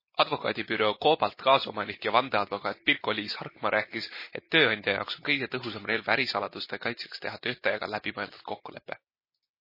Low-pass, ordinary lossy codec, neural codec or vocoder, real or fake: 5.4 kHz; MP3, 24 kbps; none; real